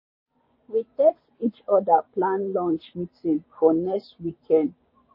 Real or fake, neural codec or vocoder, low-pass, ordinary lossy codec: fake; vocoder, 24 kHz, 100 mel bands, Vocos; 5.4 kHz; MP3, 24 kbps